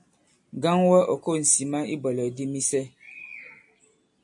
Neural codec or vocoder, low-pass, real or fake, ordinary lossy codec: none; 10.8 kHz; real; MP3, 48 kbps